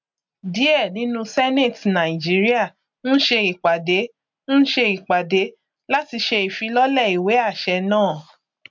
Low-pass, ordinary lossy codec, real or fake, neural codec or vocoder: 7.2 kHz; MP3, 64 kbps; real; none